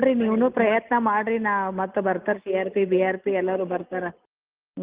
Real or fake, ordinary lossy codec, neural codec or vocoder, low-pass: real; Opus, 32 kbps; none; 3.6 kHz